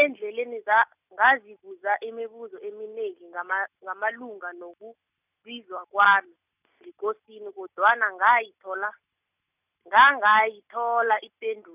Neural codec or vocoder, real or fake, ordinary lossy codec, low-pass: none; real; none; 3.6 kHz